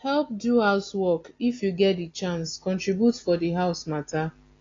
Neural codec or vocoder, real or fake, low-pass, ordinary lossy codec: none; real; 7.2 kHz; AAC, 32 kbps